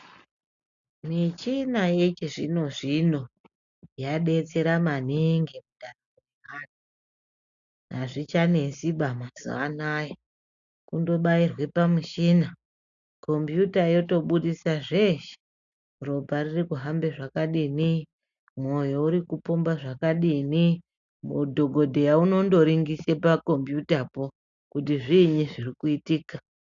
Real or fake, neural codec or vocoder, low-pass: real; none; 7.2 kHz